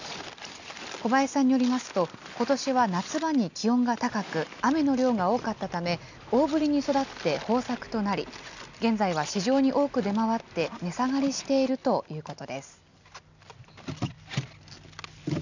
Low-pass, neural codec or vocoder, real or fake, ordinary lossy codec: 7.2 kHz; none; real; none